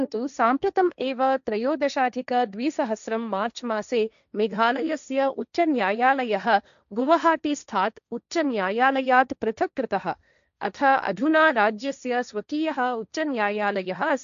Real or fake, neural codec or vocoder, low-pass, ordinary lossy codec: fake; codec, 16 kHz, 1.1 kbps, Voila-Tokenizer; 7.2 kHz; none